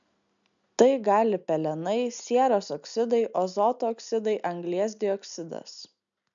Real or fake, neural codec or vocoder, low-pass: real; none; 7.2 kHz